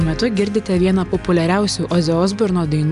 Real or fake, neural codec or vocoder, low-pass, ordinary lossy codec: real; none; 10.8 kHz; AAC, 96 kbps